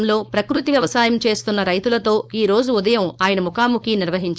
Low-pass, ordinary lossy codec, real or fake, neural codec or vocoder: none; none; fake; codec, 16 kHz, 4.8 kbps, FACodec